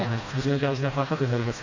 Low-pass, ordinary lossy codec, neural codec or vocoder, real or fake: 7.2 kHz; AAC, 48 kbps; codec, 16 kHz, 1 kbps, FreqCodec, smaller model; fake